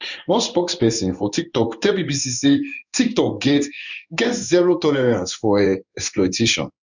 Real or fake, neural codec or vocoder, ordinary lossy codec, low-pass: fake; codec, 16 kHz in and 24 kHz out, 1 kbps, XY-Tokenizer; none; 7.2 kHz